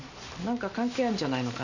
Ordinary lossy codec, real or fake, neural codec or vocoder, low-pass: none; real; none; 7.2 kHz